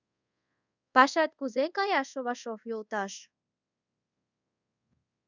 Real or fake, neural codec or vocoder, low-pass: fake; codec, 24 kHz, 0.5 kbps, DualCodec; 7.2 kHz